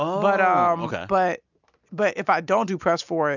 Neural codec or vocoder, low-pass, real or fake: none; 7.2 kHz; real